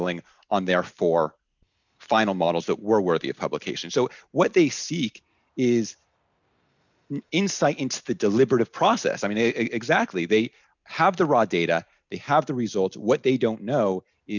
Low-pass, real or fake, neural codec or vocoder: 7.2 kHz; real; none